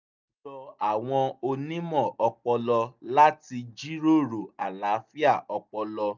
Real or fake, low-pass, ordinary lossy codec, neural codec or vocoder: real; 7.2 kHz; none; none